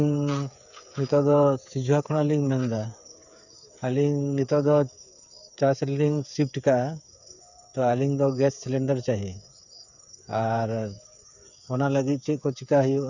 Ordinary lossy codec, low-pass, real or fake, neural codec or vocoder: none; 7.2 kHz; fake; codec, 16 kHz, 8 kbps, FreqCodec, smaller model